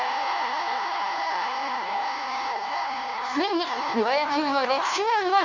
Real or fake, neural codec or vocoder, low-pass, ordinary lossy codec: fake; codec, 16 kHz, 1 kbps, FunCodec, trained on LibriTTS, 50 frames a second; 7.2 kHz; none